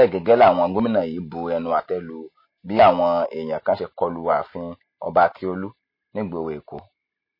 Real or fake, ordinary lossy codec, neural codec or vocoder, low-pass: real; MP3, 24 kbps; none; 5.4 kHz